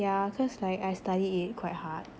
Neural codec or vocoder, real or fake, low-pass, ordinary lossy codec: none; real; none; none